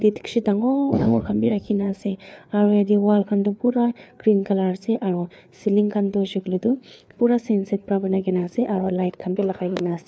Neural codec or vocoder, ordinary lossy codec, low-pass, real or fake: codec, 16 kHz, 4 kbps, FreqCodec, larger model; none; none; fake